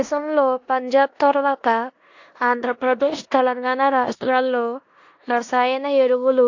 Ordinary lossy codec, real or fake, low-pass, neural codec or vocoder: AAC, 48 kbps; fake; 7.2 kHz; codec, 16 kHz in and 24 kHz out, 0.9 kbps, LongCat-Audio-Codec, four codebook decoder